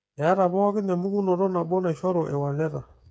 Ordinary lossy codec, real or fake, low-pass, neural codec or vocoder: none; fake; none; codec, 16 kHz, 8 kbps, FreqCodec, smaller model